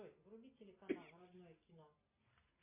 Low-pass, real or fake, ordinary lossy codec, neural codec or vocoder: 3.6 kHz; real; MP3, 24 kbps; none